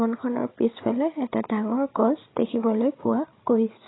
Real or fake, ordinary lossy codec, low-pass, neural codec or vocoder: fake; AAC, 16 kbps; 7.2 kHz; codec, 16 kHz, 16 kbps, FreqCodec, larger model